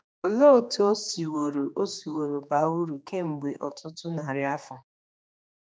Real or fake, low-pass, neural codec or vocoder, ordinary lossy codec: fake; none; codec, 16 kHz, 2 kbps, X-Codec, HuBERT features, trained on general audio; none